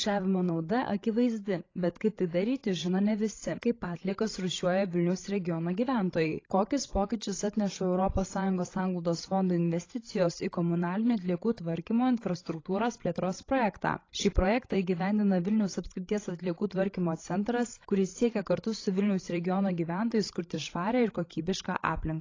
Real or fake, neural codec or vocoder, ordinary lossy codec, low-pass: fake; codec, 16 kHz, 16 kbps, FreqCodec, larger model; AAC, 32 kbps; 7.2 kHz